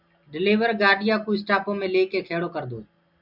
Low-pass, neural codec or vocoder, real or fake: 5.4 kHz; none; real